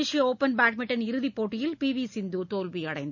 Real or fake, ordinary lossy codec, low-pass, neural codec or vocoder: real; none; 7.2 kHz; none